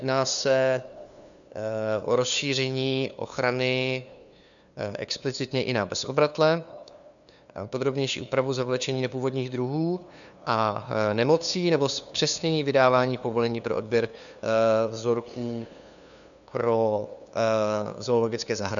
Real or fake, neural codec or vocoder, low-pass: fake; codec, 16 kHz, 2 kbps, FunCodec, trained on LibriTTS, 25 frames a second; 7.2 kHz